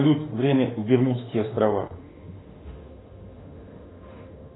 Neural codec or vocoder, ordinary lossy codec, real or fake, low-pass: autoencoder, 48 kHz, 32 numbers a frame, DAC-VAE, trained on Japanese speech; AAC, 16 kbps; fake; 7.2 kHz